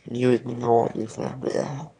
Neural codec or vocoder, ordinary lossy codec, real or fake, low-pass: autoencoder, 22.05 kHz, a latent of 192 numbers a frame, VITS, trained on one speaker; none; fake; 9.9 kHz